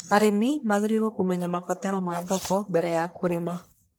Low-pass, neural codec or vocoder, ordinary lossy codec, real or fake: none; codec, 44.1 kHz, 1.7 kbps, Pupu-Codec; none; fake